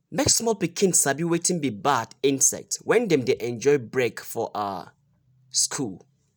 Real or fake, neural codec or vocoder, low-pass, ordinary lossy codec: real; none; none; none